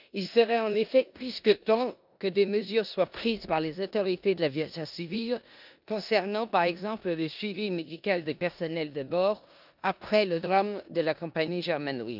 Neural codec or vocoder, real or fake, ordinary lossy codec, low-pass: codec, 16 kHz in and 24 kHz out, 0.9 kbps, LongCat-Audio-Codec, four codebook decoder; fake; none; 5.4 kHz